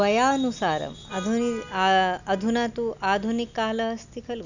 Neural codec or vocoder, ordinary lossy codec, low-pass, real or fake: none; none; 7.2 kHz; real